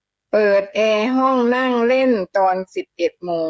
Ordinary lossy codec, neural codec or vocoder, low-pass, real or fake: none; codec, 16 kHz, 16 kbps, FreqCodec, smaller model; none; fake